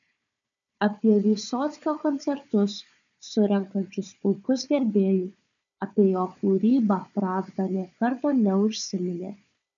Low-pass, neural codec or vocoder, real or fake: 7.2 kHz; codec, 16 kHz, 4 kbps, FunCodec, trained on Chinese and English, 50 frames a second; fake